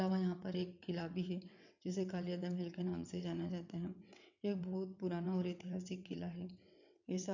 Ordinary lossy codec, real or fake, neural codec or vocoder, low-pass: none; fake; vocoder, 22.05 kHz, 80 mel bands, WaveNeXt; 7.2 kHz